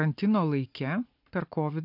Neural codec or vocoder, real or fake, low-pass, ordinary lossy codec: none; real; 5.4 kHz; MP3, 48 kbps